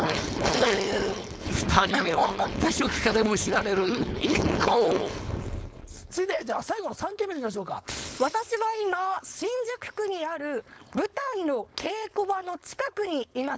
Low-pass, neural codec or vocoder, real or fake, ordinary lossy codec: none; codec, 16 kHz, 4.8 kbps, FACodec; fake; none